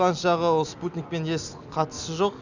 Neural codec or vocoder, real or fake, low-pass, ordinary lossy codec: none; real; 7.2 kHz; none